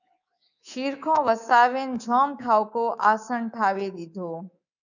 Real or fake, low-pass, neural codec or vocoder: fake; 7.2 kHz; codec, 24 kHz, 3.1 kbps, DualCodec